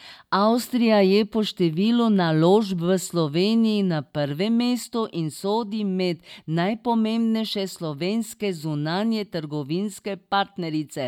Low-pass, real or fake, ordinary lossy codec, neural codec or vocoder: 19.8 kHz; real; MP3, 96 kbps; none